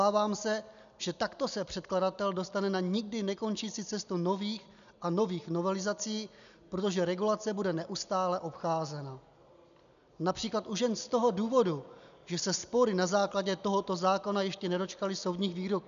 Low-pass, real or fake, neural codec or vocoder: 7.2 kHz; real; none